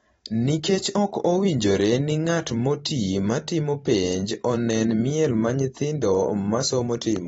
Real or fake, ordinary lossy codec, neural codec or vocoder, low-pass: fake; AAC, 24 kbps; vocoder, 44.1 kHz, 128 mel bands every 512 samples, BigVGAN v2; 19.8 kHz